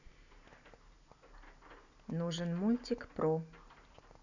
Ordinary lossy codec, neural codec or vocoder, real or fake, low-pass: none; none; real; 7.2 kHz